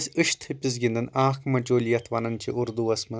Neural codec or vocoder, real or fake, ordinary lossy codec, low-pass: none; real; none; none